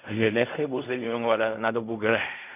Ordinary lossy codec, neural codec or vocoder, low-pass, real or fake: none; codec, 16 kHz in and 24 kHz out, 0.4 kbps, LongCat-Audio-Codec, fine tuned four codebook decoder; 3.6 kHz; fake